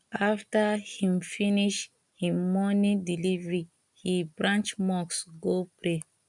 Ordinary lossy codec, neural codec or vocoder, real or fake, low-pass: none; none; real; 10.8 kHz